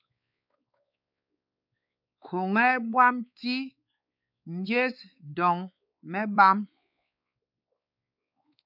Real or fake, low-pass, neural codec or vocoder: fake; 5.4 kHz; codec, 16 kHz, 4 kbps, X-Codec, WavLM features, trained on Multilingual LibriSpeech